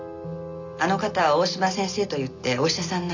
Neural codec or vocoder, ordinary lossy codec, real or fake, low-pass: none; none; real; 7.2 kHz